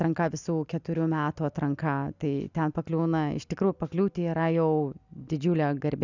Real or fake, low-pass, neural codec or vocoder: real; 7.2 kHz; none